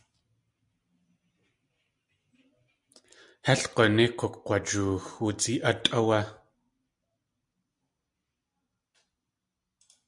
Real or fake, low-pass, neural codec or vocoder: real; 10.8 kHz; none